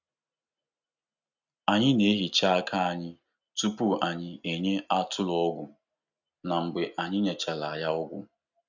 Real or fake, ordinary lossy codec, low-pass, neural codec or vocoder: real; none; 7.2 kHz; none